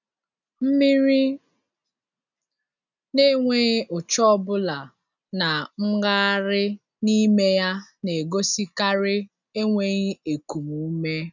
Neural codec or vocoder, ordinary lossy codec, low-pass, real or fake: none; none; 7.2 kHz; real